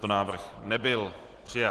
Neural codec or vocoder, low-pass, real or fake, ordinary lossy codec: none; 10.8 kHz; real; Opus, 16 kbps